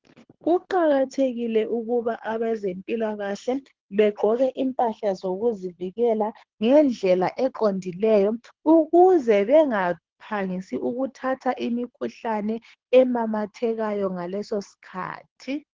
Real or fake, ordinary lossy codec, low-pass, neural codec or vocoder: fake; Opus, 16 kbps; 7.2 kHz; codec, 24 kHz, 6 kbps, HILCodec